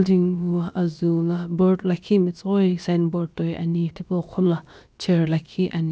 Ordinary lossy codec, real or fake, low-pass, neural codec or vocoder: none; fake; none; codec, 16 kHz, about 1 kbps, DyCAST, with the encoder's durations